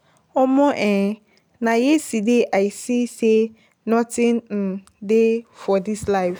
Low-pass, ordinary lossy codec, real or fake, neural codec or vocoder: none; none; real; none